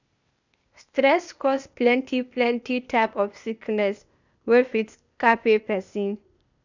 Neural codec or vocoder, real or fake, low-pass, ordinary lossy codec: codec, 16 kHz, 0.8 kbps, ZipCodec; fake; 7.2 kHz; none